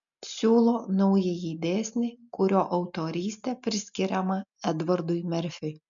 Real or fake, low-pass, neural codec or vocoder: real; 7.2 kHz; none